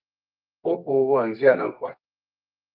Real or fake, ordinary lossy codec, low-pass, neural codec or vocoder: fake; Opus, 24 kbps; 5.4 kHz; codec, 24 kHz, 0.9 kbps, WavTokenizer, medium music audio release